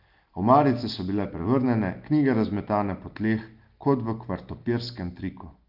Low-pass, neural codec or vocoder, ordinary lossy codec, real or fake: 5.4 kHz; none; Opus, 32 kbps; real